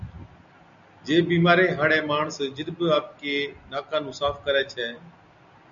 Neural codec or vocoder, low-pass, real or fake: none; 7.2 kHz; real